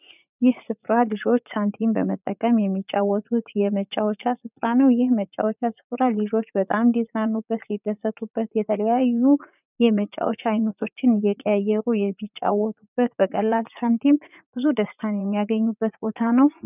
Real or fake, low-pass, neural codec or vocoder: fake; 3.6 kHz; vocoder, 44.1 kHz, 80 mel bands, Vocos